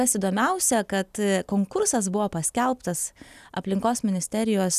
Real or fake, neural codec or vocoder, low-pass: real; none; 14.4 kHz